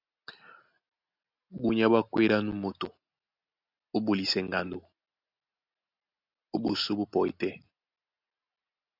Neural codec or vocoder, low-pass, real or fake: none; 5.4 kHz; real